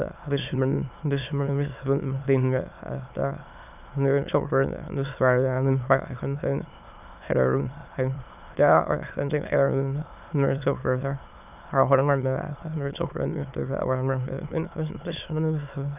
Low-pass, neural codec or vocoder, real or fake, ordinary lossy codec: 3.6 kHz; autoencoder, 22.05 kHz, a latent of 192 numbers a frame, VITS, trained on many speakers; fake; none